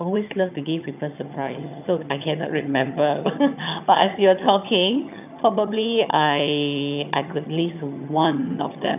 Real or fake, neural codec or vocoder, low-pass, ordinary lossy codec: fake; vocoder, 22.05 kHz, 80 mel bands, HiFi-GAN; 3.6 kHz; none